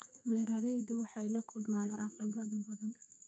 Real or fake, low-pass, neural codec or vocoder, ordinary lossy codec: fake; 9.9 kHz; codec, 32 kHz, 1.9 kbps, SNAC; none